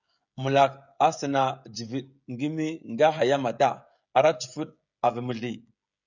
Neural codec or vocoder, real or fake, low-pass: codec, 16 kHz, 16 kbps, FreqCodec, smaller model; fake; 7.2 kHz